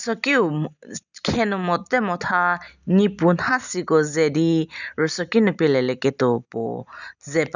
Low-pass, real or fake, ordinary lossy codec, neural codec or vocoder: 7.2 kHz; real; none; none